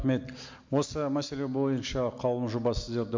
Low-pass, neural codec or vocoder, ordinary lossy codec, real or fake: 7.2 kHz; none; MP3, 48 kbps; real